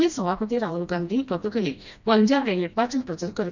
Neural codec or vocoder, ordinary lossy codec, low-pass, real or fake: codec, 16 kHz, 1 kbps, FreqCodec, smaller model; none; 7.2 kHz; fake